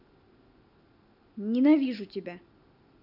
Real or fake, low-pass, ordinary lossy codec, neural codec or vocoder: real; 5.4 kHz; none; none